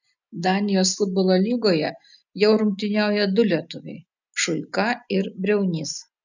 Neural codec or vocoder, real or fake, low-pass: none; real; 7.2 kHz